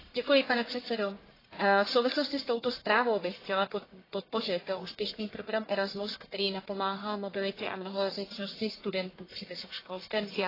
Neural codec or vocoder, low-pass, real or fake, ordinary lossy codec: codec, 44.1 kHz, 1.7 kbps, Pupu-Codec; 5.4 kHz; fake; AAC, 24 kbps